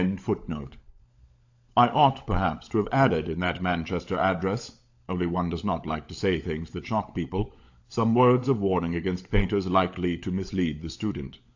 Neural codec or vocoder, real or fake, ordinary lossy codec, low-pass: codec, 16 kHz, 16 kbps, FunCodec, trained on LibriTTS, 50 frames a second; fake; AAC, 48 kbps; 7.2 kHz